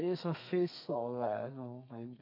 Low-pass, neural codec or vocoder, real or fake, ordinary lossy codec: 5.4 kHz; codec, 32 kHz, 1.9 kbps, SNAC; fake; MP3, 48 kbps